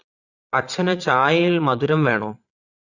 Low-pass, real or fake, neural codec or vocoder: 7.2 kHz; fake; vocoder, 22.05 kHz, 80 mel bands, Vocos